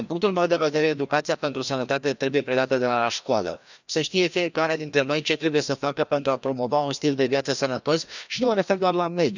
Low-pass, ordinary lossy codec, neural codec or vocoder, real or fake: 7.2 kHz; none; codec, 16 kHz, 1 kbps, FreqCodec, larger model; fake